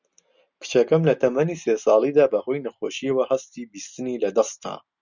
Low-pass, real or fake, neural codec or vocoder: 7.2 kHz; real; none